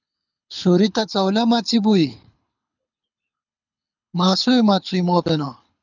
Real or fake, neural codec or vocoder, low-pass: fake; codec, 24 kHz, 6 kbps, HILCodec; 7.2 kHz